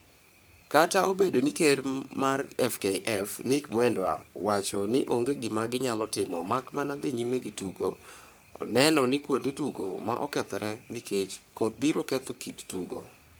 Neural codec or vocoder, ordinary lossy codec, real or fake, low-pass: codec, 44.1 kHz, 3.4 kbps, Pupu-Codec; none; fake; none